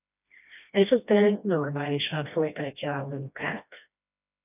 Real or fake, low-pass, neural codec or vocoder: fake; 3.6 kHz; codec, 16 kHz, 1 kbps, FreqCodec, smaller model